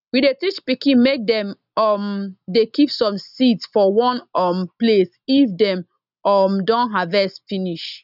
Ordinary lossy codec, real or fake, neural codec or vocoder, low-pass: none; real; none; 5.4 kHz